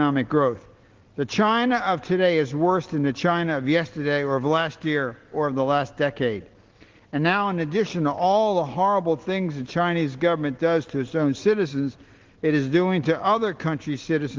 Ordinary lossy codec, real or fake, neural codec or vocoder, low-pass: Opus, 16 kbps; real; none; 7.2 kHz